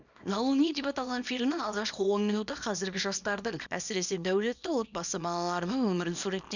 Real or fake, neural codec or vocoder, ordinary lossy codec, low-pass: fake; codec, 24 kHz, 0.9 kbps, WavTokenizer, small release; none; 7.2 kHz